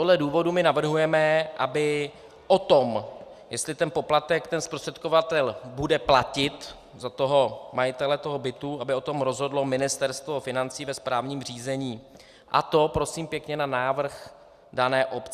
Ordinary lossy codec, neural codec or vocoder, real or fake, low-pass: Opus, 64 kbps; none; real; 14.4 kHz